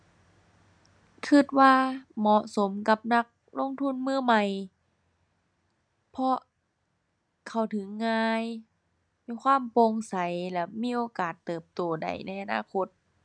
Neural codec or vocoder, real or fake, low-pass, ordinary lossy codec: none; real; 9.9 kHz; none